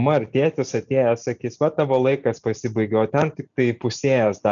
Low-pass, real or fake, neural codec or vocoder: 7.2 kHz; real; none